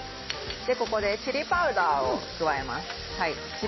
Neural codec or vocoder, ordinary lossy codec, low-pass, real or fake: none; MP3, 24 kbps; 7.2 kHz; real